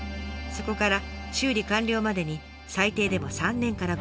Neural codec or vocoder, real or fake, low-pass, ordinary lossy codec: none; real; none; none